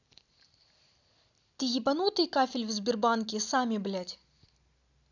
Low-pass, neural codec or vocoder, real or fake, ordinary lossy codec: 7.2 kHz; none; real; none